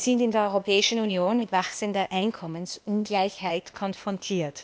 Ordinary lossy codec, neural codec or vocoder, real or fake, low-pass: none; codec, 16 kHz, 0.8 kbps, ZipCodec; fake; none